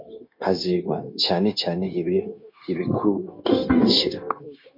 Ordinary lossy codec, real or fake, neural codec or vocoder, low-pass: MP3, 32 kbps; fake; codec, 16 kHz, 0.9 kbps, LongCat-Audio-Codec; 5.4 kHz